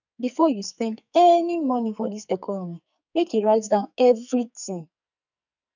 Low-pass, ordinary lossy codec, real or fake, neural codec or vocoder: 7.2 kHz; none; fake; codec, 44.1 kHz, 2.6 kbps, SNAC